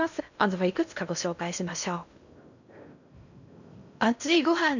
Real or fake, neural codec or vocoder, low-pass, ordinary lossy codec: fake; codec, 16 kHz in and 24 kHz out, 0.6 kbps, FocalCodec, streaming, 2048 codes; 7.2 kHz; none